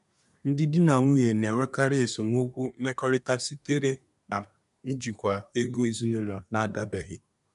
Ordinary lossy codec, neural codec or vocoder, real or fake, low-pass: none; codec, 24 kHz, 1 kbps, SNAC; fake; 10.8 kHz